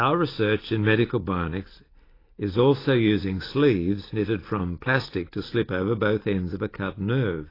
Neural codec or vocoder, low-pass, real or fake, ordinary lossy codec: none; 5.4 kHz; real; AAC, 24 kbps